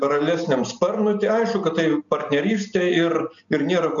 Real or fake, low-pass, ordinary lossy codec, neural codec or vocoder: real; 7.2 kHz; MP3, 96 kbps; none